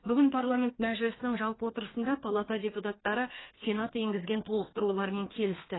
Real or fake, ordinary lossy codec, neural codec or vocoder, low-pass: fake; AAC, 16 kbps; codec, 32 kHz, 1.9 kbps, SNAC; 7.2 kHz